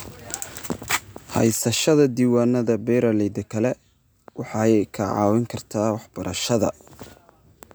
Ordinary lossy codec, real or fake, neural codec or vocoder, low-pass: none; real; none; none